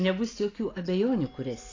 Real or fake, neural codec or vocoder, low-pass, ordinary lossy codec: real; none; 7.2 kHz; AAC, 32 kbps